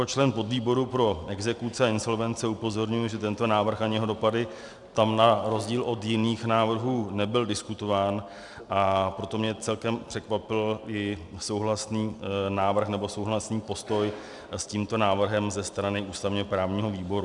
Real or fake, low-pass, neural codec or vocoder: real; 10.8 kHz; none